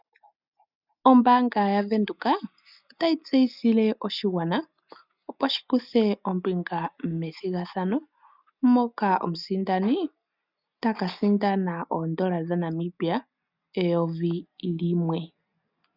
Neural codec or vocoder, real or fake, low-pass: none; real; 5.4 kHz